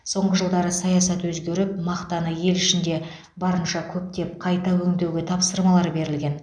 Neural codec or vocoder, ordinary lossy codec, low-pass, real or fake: none; none; 9.9 kHz; real